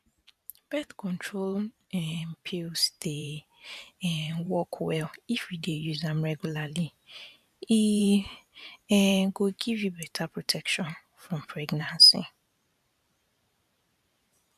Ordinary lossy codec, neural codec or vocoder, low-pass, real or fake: none; vocoder, 44.1 kHz, 128 mel bands every 512 samples, BigVGAN v2; 14.4 kHz; fake